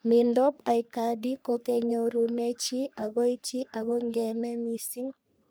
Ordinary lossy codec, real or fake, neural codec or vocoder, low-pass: none; fake; codec, 44.1 kHz, 3.4 kbps, Pupu-Codec; none